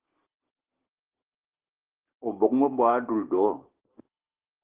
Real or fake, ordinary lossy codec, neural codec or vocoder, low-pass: real; Opus, 16 kbps; none; 3.6 kHz